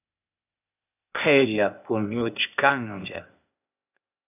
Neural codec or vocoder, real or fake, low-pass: codec, 16 kHz, 0.8 kbps, ZipCodec; fake; 3.6 kHz